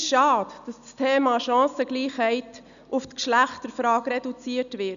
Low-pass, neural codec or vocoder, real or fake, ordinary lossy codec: 7.2 kHz; none; real; none